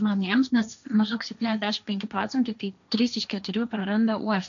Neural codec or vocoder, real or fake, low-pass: codec, 16 kHz, 1.1 kbps, Voila-Tokenizer; fake; 7.2 kHz